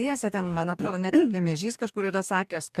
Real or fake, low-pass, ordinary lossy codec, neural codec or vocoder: fake; 14.4 kHz; MP3, 96 kbps; codec, 44.1 kHz, 2.6 kbps, DAC